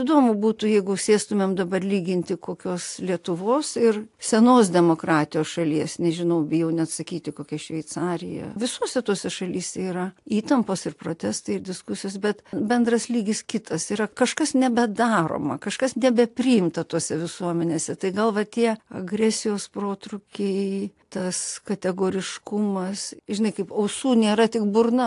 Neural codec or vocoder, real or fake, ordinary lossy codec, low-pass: none; real; AAC, 64 kbps; 10.8 kHz